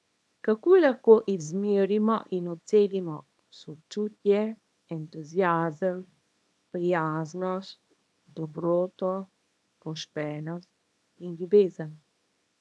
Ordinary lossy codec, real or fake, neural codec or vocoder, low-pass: none; fake; codec, 24 kHz, 0.9 kbps, WavTokenizer, small release; none